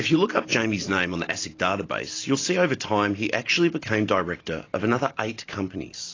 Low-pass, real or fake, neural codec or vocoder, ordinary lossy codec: 7.2 kHz; real; none; AAC, 32 kbps